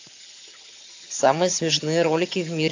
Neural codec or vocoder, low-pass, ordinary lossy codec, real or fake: vocoder, 22.05 kHz, 80 mel bands, HiFi-GAN; 7.2 kHz; AAC, 48 kbps; fake